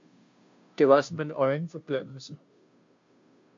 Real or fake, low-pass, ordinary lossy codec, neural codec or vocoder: fake; 7.2 kHz; MP3, 48 kbps; codec, 16 kHz, 0.5 kbps, FunCodec, trained on Chinese and English, 25 frames a second